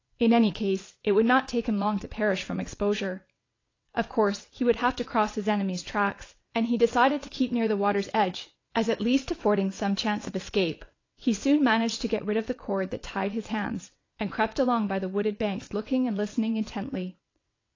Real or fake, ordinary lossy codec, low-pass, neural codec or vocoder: fake; AAC, 32 kbps; 7.2 kHz; vocoder, 22.05 kHz, 80 mel bands, WaveNeXt